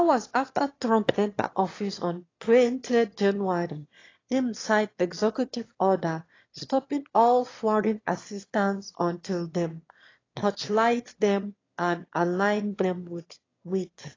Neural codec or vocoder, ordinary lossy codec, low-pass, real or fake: autoencoder, 22.05 kHz, a latent of 192 numbers a frame, VITS, trained on one speaker; AAC, 32 kbps; 7.2 kHz; fake